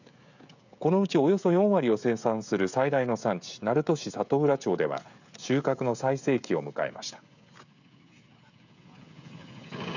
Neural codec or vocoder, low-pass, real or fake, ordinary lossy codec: codec, 16 kHz, 16 kbps, FreqCodec, smaller model; 7.2 kHz; fake; none